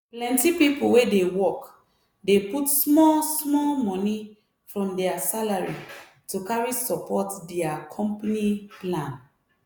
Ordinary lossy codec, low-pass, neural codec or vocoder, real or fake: none; none; none; real